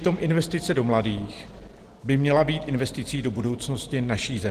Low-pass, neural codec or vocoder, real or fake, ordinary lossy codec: 14.4 kHz; none; real; Opus, 16 kbps